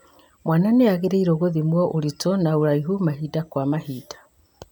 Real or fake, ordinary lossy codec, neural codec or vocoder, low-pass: real; none; none; none